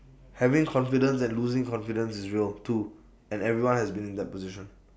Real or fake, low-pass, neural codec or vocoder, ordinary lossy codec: real; none; none; none